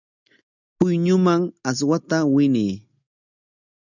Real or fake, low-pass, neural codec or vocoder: real; 7.2 kHz; none